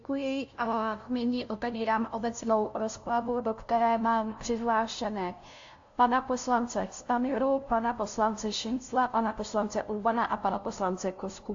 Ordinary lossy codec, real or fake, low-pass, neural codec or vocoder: AAC, 48 kbps; fake; 7.2 kHz; codec, 16 kHz, 0.5 kbps, FunCodec, trained on LibriTTS, 25 frames a second